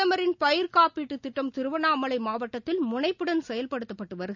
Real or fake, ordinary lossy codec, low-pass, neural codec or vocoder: real; none; 7.2 kHz; none